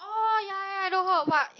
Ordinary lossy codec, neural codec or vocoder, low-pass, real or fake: AAC, 32 kbps; none; 7.2 kHz; real